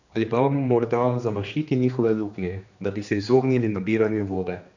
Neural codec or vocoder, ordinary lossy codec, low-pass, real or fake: codec, 16 kHz, 2 kbps, X-Codec, HuBERT features, trained on general audio; none; 7.2 kHz; fake